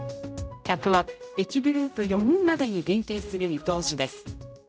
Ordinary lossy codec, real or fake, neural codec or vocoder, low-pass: none; fake; codec, 16 kHz, 0.5 kbps, X-Codec, HuBERT features, trained on general audio; none